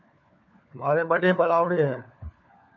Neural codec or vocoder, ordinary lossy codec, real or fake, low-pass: codec, 16 kHz, 4 kbps, FunCodec, trained on LibriTTS, 50 frames a second; MP3, 64 kbps; fake; 7.2 kHz